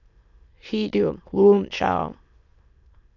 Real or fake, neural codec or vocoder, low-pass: fake; autoencoder, 22.05 kHz, a latent of 192 numbers a frame, VITS, trained on many speakers; 7.2 kHz